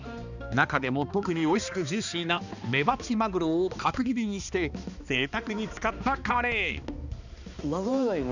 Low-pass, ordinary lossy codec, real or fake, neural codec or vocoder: 7.2 kHz; none; fake; codec, 16 kHz, 2 kbps, X-Codec, HuBERT features, trained on balanced general audio